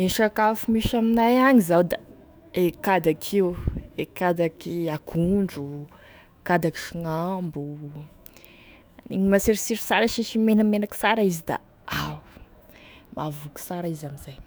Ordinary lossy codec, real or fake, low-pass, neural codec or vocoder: none; fake; none; autoencoder, 48 kHz, 128 numbers a frame, DAC-VAE, trained on Japanese speech